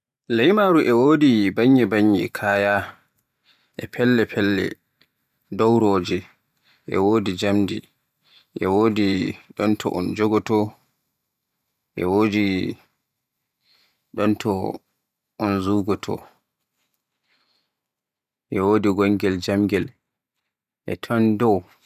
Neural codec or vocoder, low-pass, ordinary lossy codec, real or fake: vocoder, 44.1 kHz, 128 mel bands every 512 samples, BigVGAN v2; 14.4 kHz; none; fake